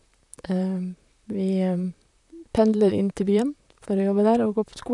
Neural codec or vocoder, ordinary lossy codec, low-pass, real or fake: vocoder, 44.1 kHz, 128 mel bands, Pupu-Vocoder; none; 10.8 kHz; fake